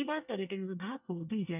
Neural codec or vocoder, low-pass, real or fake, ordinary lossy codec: codec, 24 kHz, 1 kbps, SNAC; 3.6 kHz; fake; none